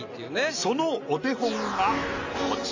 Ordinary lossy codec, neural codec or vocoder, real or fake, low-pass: AAC, 48 kbps; none; real; 7.2 kHz